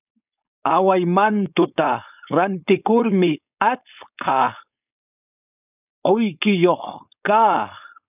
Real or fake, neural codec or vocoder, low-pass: fake; codec, 16 kHz, 4.8 kbps, FACodec; 3.6 kHz